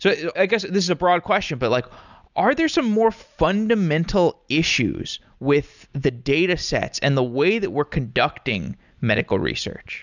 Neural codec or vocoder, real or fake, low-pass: none; real; 7.2 kHz